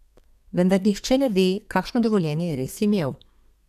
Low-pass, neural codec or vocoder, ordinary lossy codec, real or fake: 14.4 kHz; codec, 32 kHz, 1.9 kbps, SNAC; none; fake